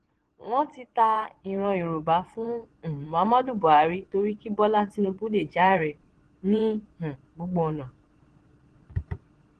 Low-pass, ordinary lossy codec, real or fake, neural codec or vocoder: 9.9 kHz; Opus, 16 kbps; fake; vocoder, 22.05 kHz, 80 mel bands, Vocos